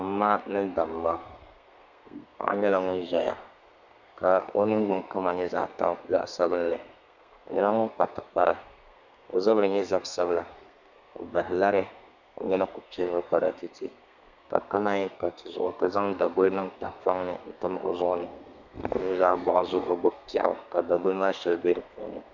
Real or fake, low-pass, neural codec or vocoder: fake; 7.2 kHz; codec, 32 kHz, 1.9 kbps, SNAC